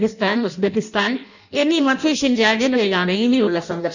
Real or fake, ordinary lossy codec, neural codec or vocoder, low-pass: fake; none; codec, 16 kHz in and 24 kHz out, 0.6 kbps, FireRedTTS-2 codec; 7.2 kHz